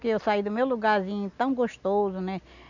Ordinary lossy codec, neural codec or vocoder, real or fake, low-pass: none; none; real; 7.2 kHz